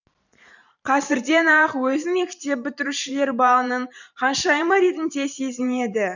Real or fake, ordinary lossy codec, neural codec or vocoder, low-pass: fake; none; vocoder, 44.1 kHz, 128 mel bands every 512 samples, BigVGAN v2; 7.2 kHz